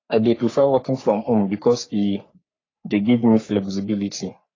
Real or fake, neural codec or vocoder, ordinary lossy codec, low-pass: fake; codec, 44.1 kHz, 3.4 kbps, Pupu-Codec; AAC, 32 kbps; 7.2 kHz